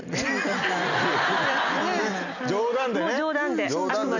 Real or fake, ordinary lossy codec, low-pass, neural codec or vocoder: real; none; 7.2 kHz; none